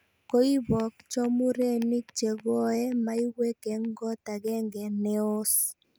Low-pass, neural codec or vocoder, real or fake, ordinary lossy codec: none; none; real; none